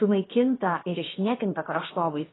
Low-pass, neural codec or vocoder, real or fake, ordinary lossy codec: 7.2 kHz; codec, 16 kHz, 0.7 kbps, FocalCodec; fake; AAC, 16 kbps